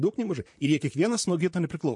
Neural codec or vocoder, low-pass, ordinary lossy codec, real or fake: vocoder, 44.1 kHz, 128 mel bands, Pupu-Vocoder; 10.8 kHz; MP3, 48 kbps; fake